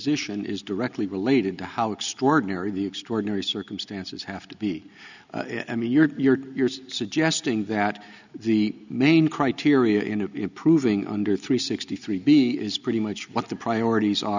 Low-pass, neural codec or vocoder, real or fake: 7.2 kHz; none; real